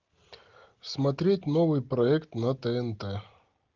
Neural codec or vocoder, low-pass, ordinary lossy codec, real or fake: none; 7.2 kHz; Opus, 32 kbps; real